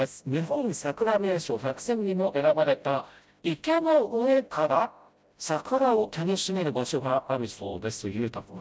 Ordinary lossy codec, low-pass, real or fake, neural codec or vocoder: none; none; fake; codec, 16 kHz, 0.5 kbps, FreqCodec, smaller model